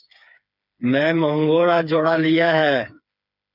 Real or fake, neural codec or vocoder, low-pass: fake; codec, 16 kHz, 4 kbps, FreqCodec, smaller model; 5.4 kHz